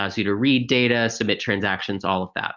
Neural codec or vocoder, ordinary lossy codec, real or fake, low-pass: none; Opus, 32 kbps; real; 7.2 kHz